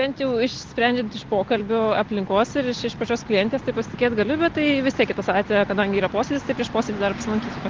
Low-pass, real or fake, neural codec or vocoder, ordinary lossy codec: 7.2 kHz; real; none; Opus, 16 kbps